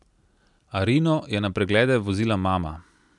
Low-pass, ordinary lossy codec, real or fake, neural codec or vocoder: 10.8 kHz; none; real; none